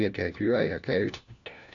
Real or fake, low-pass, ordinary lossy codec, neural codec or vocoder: fake; 7.2 kHz; MP3, 96 kbps; codec, 16 kHz, 1 kbps, FunCodec, trained on LibriTTS, 50 frames a second